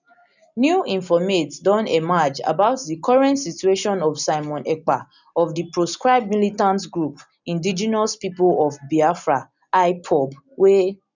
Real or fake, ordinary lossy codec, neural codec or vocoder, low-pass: real; none; none; 7.2 kHz